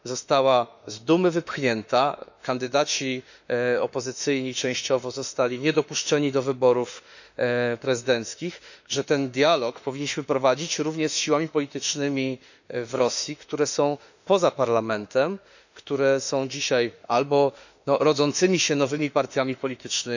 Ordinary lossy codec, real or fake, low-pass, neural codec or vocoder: none; fake; 7.2 kHz; autoencoder, 48 kHz, 32 numbers a frame, DAC-VAE, trained on Japanese speech